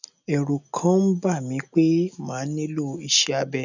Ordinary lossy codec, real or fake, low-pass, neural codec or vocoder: none; real; 7.2 kHz; none